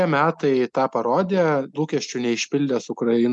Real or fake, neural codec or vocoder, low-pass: real; none; 10.8 kHz